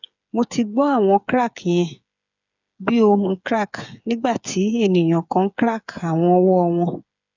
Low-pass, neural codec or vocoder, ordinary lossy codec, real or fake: 7.2 kHz; codec, 16 kHz, 8 kbps, FreqCodec, smaller model; none; fake